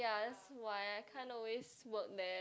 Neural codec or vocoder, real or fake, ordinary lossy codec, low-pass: none; real; none; none